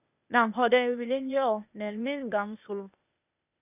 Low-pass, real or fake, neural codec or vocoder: 3.6 kHz; fake; codec, 16 kHz, 0.8 kbps, ZipCodec